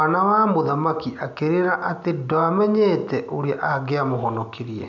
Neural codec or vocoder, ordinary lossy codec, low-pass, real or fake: none; none; 7.2 kHz; real